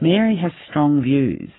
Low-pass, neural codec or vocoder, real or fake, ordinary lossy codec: 7.2 kHz; codec, 44.1 kHz, 7.8 kbps, Pupu-Codec; fake; AAC, 16 kbps